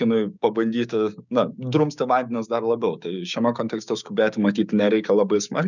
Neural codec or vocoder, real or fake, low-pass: codec, 16 kHz, 6 kbps, DAC; fake; 7.2 kHz